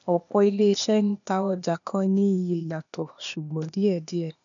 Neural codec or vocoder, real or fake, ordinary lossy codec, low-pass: codec, 16 kHz, 0.8 kbps, ZipCodec; fake; none; 7.2 kHz